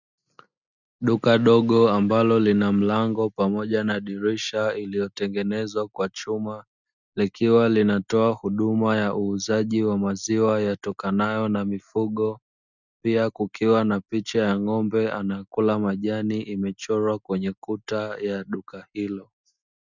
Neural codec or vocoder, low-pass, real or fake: none; 7.2 kHz; real